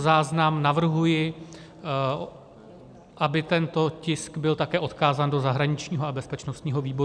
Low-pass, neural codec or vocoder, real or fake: 9.9 kHz; none; real